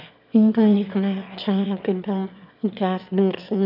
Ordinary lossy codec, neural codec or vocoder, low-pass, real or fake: none; autoencoder, 22.05 kHz, a latent of 192 numbers a frame, VITS, trained on one speaker; 5.4 kHz; fake